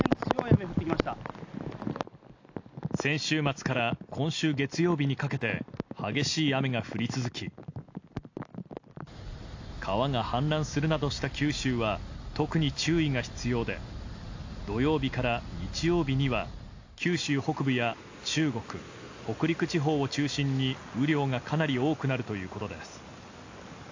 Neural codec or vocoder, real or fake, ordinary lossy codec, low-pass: none; real; AAC, 48 kbps; 7.2 kHz